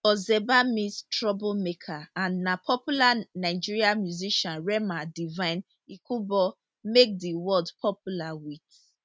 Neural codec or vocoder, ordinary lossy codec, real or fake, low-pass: none; none; real; none